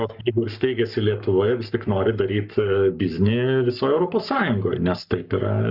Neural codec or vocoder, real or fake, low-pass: codec, 44.1 kHz, 7.8 kbps, Pupu-Codec; fake; 5.4 kHz